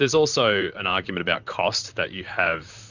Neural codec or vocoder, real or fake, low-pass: vocoder, 44.1 kHz, 128 mel bands, Pupu-Vocoder; fake; 7.2 kHz